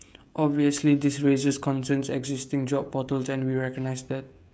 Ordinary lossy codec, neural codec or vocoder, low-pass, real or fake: none; codec, 16 kHz, 16 kbps, FreqCodec, smaller model; none; fake